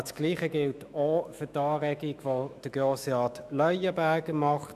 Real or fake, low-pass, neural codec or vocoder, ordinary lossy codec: fake; 14.4 kHz; autoencoder, 48 kHz, 128 numbers a frame, DAC-VAE, trained on Japanese speech; none